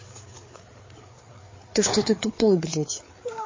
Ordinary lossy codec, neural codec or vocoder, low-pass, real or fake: MP3, 32 kbps; codec, 16 kHz, 4 kbps, FreqCodec, larger model; 7.2 kHz; fake